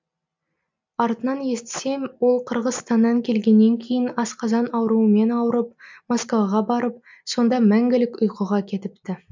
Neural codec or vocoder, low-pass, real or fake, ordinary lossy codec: none; 7.2 kHz; real; MP3, 64 kbps